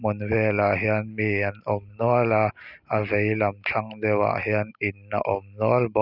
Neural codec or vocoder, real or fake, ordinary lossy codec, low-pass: none; real; none; 5.4 kHz